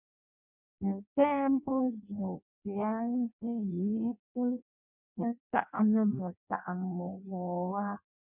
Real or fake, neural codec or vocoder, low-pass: fake; codec, 16 kHz in and 24 kHz out, 0.6 kbps, FireRedTTS-2 codec; 3.6 kHz